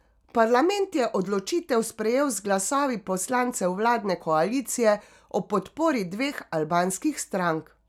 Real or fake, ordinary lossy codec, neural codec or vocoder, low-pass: real; none; none; 19.8 kHz